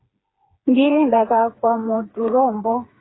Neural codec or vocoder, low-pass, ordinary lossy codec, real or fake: codec, 16 kHz, 4 kbps, FreqCodec, smaller model; 7.2 kHz; AAC, 16 kbps; fake